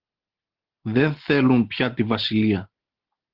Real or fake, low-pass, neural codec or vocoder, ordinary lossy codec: real; 5.4 kHz; none; Opus, 16 kbps